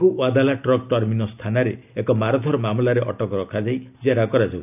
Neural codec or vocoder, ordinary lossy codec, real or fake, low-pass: none; none; real; 3.6 kHz